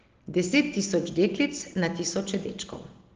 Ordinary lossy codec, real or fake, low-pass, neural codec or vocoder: Opus, 16 kbps; real; 7.2 kHz; none